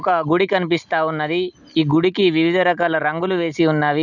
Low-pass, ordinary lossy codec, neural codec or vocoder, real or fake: 7.2 kHz; none; none; real